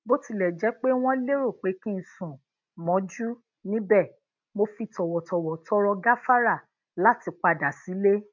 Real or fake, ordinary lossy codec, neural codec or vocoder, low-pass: real; MP3, 64 kbps; none; 7.2 kHz